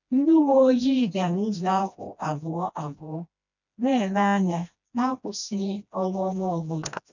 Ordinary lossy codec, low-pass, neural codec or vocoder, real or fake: none; 7.2 kHz; codec, 16 kHz, 1 kbps, FreqCodec, smaller model; fake